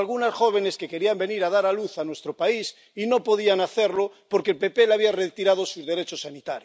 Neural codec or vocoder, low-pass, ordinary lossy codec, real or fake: none; none; none; real